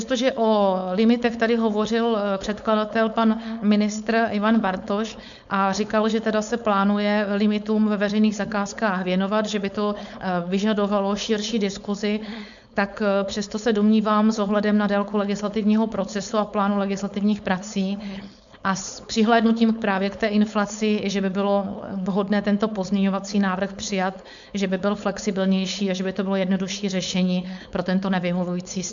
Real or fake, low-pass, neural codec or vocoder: fake; 7.2 kHz; codec, 16 kHz, 4.8 kbps, FACodec